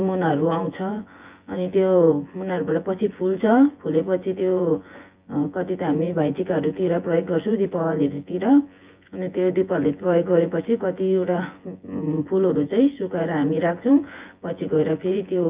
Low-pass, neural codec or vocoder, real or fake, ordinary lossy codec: 3.6 kHz; vocoder, 24 kHz, 100 mel bands, Vocos; fake; Opus, 24 kbps